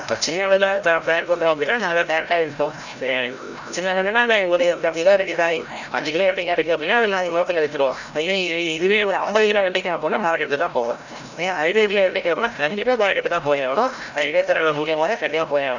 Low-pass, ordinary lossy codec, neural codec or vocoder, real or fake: 7.2 kHz; none; codec, 16 kHz, 0.5 kbps, FreqCodec, larger model; fake